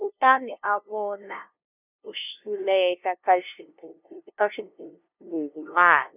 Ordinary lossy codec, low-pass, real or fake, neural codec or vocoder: none; 3.6 kHz; fake; codec, 16 kHz, 0.5 kbps, FunCodec, trained on LibriTTS, 25 frames a second